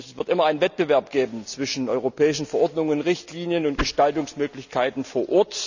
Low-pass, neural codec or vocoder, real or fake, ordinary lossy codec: 7.2 kHz; none; real; none